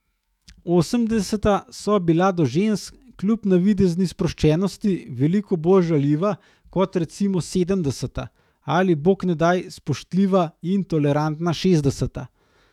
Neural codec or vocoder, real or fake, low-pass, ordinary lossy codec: autoencoder, 48 kHz, 128 numbers a frame, DAC-VAE, trained on Japanese speech; fake; 19.8 kHz; none